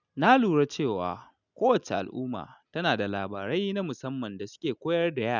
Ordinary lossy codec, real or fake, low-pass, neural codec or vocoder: none; real; 7.2 kHz; none